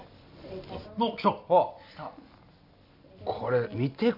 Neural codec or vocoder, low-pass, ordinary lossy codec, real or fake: none; 5.4 kHz; none; real